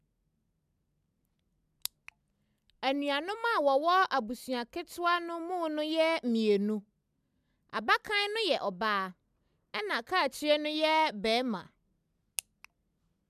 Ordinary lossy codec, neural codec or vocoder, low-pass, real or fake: none; none; 14.4 kHz; real